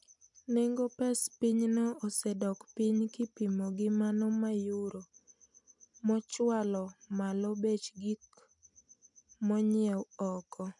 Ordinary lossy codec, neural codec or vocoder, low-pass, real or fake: none; none; 10.8 kHz; real